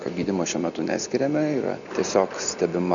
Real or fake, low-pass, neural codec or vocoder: real; 7.2 kHz; none